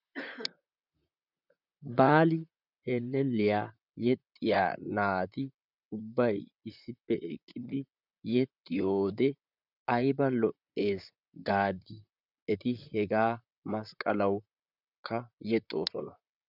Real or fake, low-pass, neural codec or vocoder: fake; 5.4 kHz; vocoder, 44.1 kHz, 128 mel bands, Pupu-Vocoder